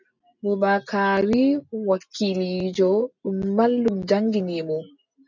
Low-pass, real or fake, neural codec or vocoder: 7.2 kHz; real; none